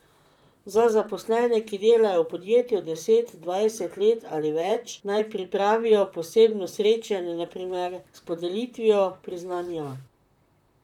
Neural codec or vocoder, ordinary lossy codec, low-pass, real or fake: vocoder, 44.1 kHz, 128 mel bands, Pupu-Vocoder; none; 19.8 kHz; fake